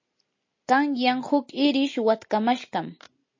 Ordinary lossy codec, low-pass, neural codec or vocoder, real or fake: MP3, 32 kbps; 7.2 kHz; none; real